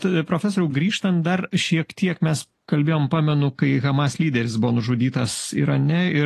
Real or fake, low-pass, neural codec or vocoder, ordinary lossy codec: real; 14.4 kHz; none; AAC, 48 kbps